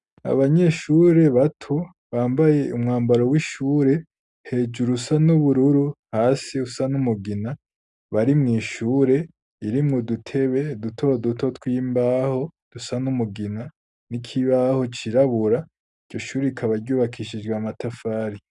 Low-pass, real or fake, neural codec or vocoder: 10.8 kHz; real; none